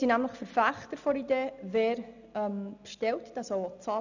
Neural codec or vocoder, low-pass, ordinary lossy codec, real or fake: none; 7.2 kHz; none; real